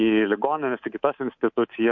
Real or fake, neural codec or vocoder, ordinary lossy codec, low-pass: fake; codec, 24 kHz, 3.1 kbps, DualCodec; MP3, 48 kbps; 7.2 kHz